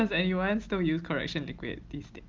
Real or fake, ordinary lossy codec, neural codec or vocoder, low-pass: real; Opus, 32 kbps; none; 7.2 kHz